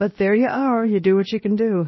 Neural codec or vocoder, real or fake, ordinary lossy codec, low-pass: codec, 16 kHz, 0.7 kbps, FocalCodec; fake; MP3, 24 kbps; 7.2 kHz